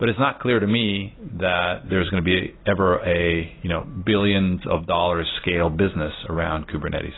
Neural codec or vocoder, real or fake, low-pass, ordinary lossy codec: none; real; 7.2 kHz; AAC, 16 kbps